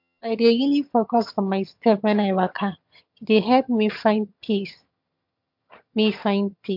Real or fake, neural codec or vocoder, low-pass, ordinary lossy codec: fake; vocoder, 22.05 kHz, 80 mel bands, HiFi-GAN; 5.4 kHz; MP3, 48 kbps